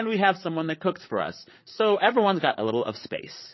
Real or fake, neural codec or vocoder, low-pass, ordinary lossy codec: fake; codec, 16 kHz, 8 kbps, FreqCodec, larger model; 7.2 kHz; MP3, 24 kbps